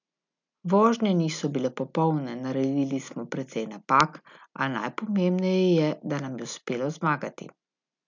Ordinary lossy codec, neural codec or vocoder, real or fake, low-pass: none; none; real; 7.2 kHz